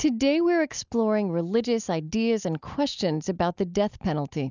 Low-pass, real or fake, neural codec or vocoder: 7.2 kHz; real; none